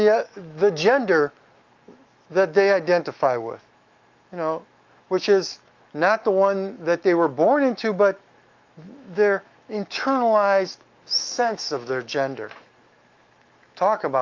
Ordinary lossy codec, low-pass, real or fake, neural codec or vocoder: Opus, 24 kbps; 7.2 kHz; fake; autoencoder, 48 kHz, 128 numbers a frame, DAC-VAE, trained on Japanese speech